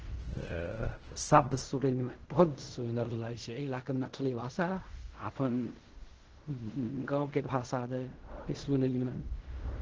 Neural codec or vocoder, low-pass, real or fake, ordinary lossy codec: codec, 16 kHz in and 24 kHz out, 0.4 kbps, LongCat-Audio-Codec, fine tuned four codebook decoder; 7.2 kHz; fake; Opus, 16 kbps